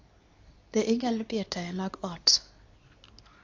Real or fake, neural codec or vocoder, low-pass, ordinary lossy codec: fake; codec, 24 kHz, 0.9 kbps, WavTokenizer, medium speech release version 2; 7.2 kHz; AAC, 48 kbps